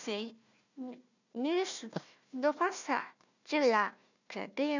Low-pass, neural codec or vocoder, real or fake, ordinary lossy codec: 7.2 kHz; codec, 16 kHz, 1 kbps, FunCodec, trained on Chinese and English, 50 frames a second; fake; none